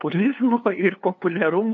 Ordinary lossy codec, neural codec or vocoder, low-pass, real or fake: AAC, 64 kbps; codec, 16 kHz, 2 kbps, FunCodec, trained on LibriTTS, 25 frames a second; 7.2 kHz; fake